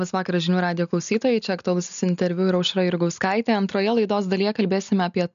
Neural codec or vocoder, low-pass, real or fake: none; 7.2 kHz; real